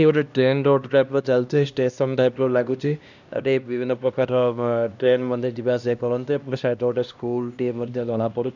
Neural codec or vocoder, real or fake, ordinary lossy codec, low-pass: codec, 16 kHz, 1 kbps, X-Codec, HuBERT features, trained on LibriSpeech; fake; none; 7.2 kHz